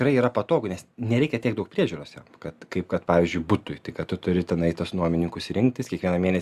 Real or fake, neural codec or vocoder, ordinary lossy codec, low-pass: real; none; Opus, 64 kbps; 14.4 kHz